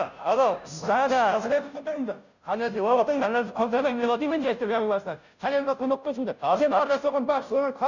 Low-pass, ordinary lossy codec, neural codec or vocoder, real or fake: 7.2 kHz; none; codec, 16 kHz, 0.5 kbps, FunCodec, trained on Chinese and English, 25 frames a second; fake